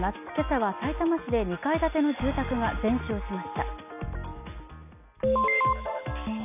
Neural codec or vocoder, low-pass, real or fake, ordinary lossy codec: none; 3.6 kHz; real; none